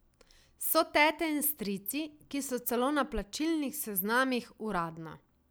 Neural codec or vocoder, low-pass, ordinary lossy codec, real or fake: none; none; none; real